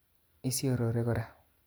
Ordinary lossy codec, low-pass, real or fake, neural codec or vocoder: none; none; real; none